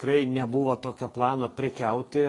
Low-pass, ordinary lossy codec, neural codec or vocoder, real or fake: 10.8 kHz; AAC, 32 kbps; codec, 44.1 kHz, 2.6 kbps, SNAC; fake